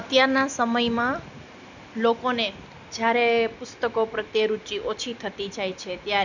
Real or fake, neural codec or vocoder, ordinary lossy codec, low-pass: real; none; none; 7.2 kHz